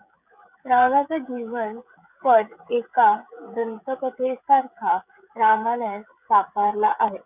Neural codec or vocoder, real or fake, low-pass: codec, 16 kHz, 16 kbps, FreqCodec, smaller model; fake; 3.6 kHz